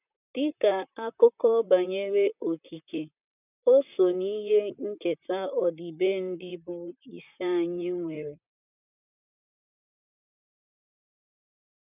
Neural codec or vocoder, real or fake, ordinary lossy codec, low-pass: vocoder, 44.1 kHz, 128 mel bands, Pupu-Vocoder; fake; none; 3.6 kHz